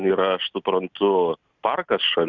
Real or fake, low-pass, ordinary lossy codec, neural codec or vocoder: real; 7.2 kHz; Opus, 64 kbps; none